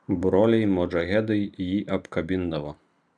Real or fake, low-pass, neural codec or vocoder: real; 9.9 kHz; none